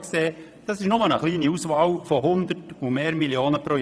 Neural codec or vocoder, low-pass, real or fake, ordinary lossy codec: vocoder, 22.05 kHz, 80 mel bands, WaveNeXt; none; fake; none